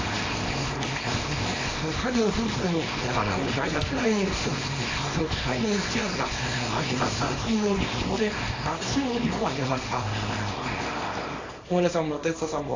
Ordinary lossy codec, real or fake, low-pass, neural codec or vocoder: AAC, 32 kbps; fake; 7.2 kHz; codec, 24 kHz, 0.9 kbps, WavTokenizer, small release